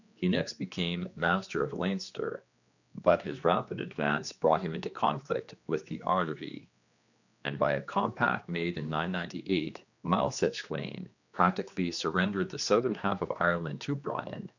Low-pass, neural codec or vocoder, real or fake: 7.2 kHz; codec, 16 kHz, 2 kbps, X-Codec, HuBERT features, trained on general audio; fake